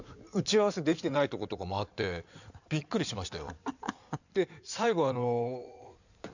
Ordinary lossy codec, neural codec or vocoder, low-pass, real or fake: none; codec, 16 kHz in and 24 kHz out, 2.2 kbps, FireRedTTS-2 codec; 7.2 kHz; fake